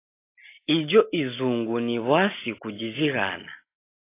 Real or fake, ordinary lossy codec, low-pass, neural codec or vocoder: real; AAC, 24 kbps; 3.6 kHz; none